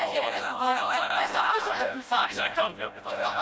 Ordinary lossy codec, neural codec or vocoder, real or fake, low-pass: none; codec, 16 kHz, 0.5 kbps, FreqCodec, smaller model; fake; none